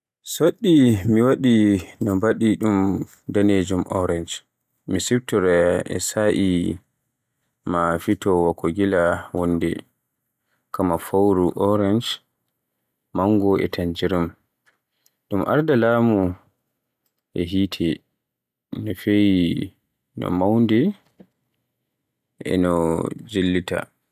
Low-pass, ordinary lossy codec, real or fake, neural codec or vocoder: 14.4 kHz; none; real; none